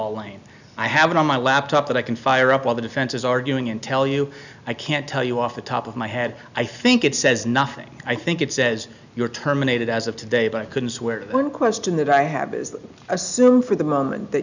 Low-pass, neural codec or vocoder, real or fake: 7.2 kHz; none; real